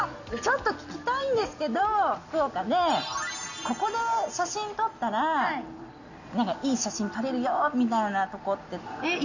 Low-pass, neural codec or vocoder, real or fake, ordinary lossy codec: 7.2 kHz; none; real; none